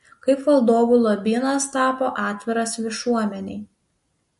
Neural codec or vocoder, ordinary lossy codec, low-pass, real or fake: none; MP3, 48 kbps; 14.4 kHz; real